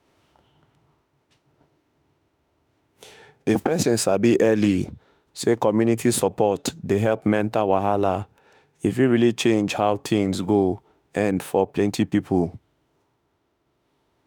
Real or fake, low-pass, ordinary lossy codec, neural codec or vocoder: fake; none; none; autoencoder, 48 kHz, 32 numbers a frame, DAC-VAE, trained on Japanese speech